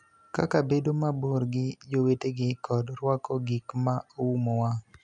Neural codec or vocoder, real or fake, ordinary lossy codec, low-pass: none; real; none; 10.8 kHz